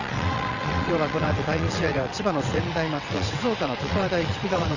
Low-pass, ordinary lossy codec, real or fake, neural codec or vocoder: 7.2 kHz; none; fake; vocoder, 22.05 kHz, 80 mel bands, WaveNeXt